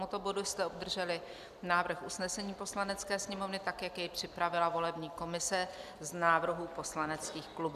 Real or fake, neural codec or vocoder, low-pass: real; none; 14.4 kHz